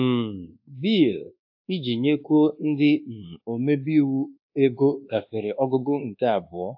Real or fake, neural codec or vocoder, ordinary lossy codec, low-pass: fake; codec, 24 kHz, 1.2 kbps, DualCodec; AAC, 48 kbps; 5.4 kHz